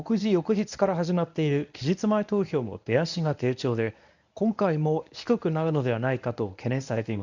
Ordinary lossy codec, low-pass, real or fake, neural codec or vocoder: none; 7.2 kHz; fake; codec, 24 kHz, 0.9 kbps, WavTokenizer, medium speech release version 1